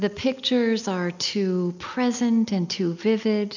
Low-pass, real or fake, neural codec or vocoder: 7.2 kHz; real; none